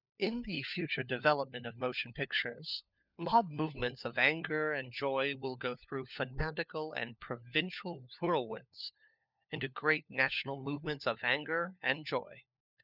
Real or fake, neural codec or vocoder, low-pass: fake; codec, 16 kHz, 4 kbps, FunCodec, trained on LibriTTS, 50 frames a second; 5.4 kHz